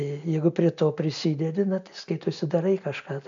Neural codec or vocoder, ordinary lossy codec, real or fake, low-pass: none; AAC, 64 kbps; real; 7.2 kHz